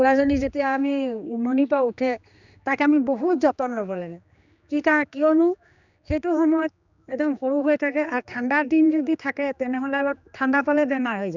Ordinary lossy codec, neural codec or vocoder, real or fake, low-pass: none; codec, 16 kHz, 2 kbps, X-Codec, HuBERT features, trained on general audio; fake; 7.2 kHz